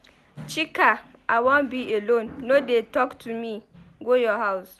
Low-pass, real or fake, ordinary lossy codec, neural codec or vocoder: 14.4 kHz; real; none; none